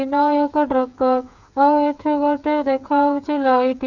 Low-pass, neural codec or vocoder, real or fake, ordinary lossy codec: 7.2 kHz; codec, 16 kHz, 8 kbps, FreqCodec, smaller model; fake; none